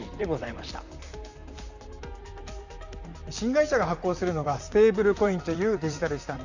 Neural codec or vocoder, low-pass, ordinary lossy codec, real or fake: vocoder, 44.1 kHz, 128 mel bands, Pupu-Vocoder; 7.2 kHz; Opus, 64 kbps; fake